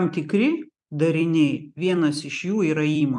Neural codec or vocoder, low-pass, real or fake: vocoder, 44.1 kHz, 128 mel bands every 256 samples, BigVGAN v2; 10.8 kHz; fake